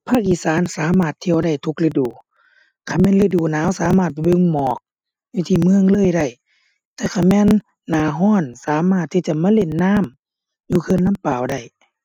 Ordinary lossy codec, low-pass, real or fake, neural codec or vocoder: none; 19.8 kHz; real; none